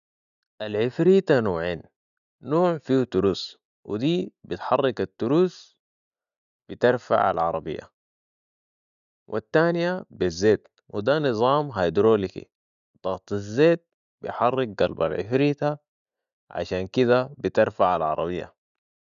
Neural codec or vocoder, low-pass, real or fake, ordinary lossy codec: none; 7.2 kHz; real; none